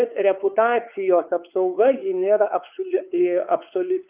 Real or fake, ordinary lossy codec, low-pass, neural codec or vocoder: fake; Opus, 24 kbps; 3.6 kHz; codec, 16 kHz, 4 kbps, X-Codec, WavLM features, trained on Multilingual LibriSpeech